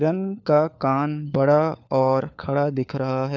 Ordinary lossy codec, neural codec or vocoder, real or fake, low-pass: none; codec, 16 kHz, 4 kbps, FunCodec, trained on LibriTTS, 50 frames a second; fake; 7.2 kHz